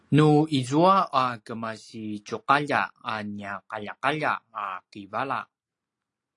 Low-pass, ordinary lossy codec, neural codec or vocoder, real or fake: 10.8 kHz; AAC, 48 kbps; none; real